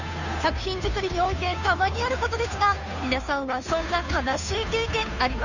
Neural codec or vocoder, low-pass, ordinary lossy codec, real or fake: codec, 16 kHz, 2 kbps, FunCodec, trained on Chinese and English, 25 frames a second; 7.2 kHz; none; fake